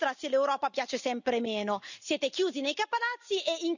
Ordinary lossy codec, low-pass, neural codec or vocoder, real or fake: none; 7.2 kHz; none; real